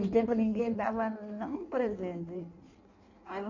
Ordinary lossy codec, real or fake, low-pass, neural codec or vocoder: none; fake; 7.2 kHz; codec, 16 kHz in and 24 kHz out, 1.1 kbps, FireRedTTS-2 codec